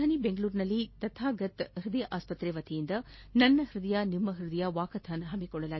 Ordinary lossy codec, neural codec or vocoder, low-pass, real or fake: MP3, 24 kbps; none; 7.2 kHz; real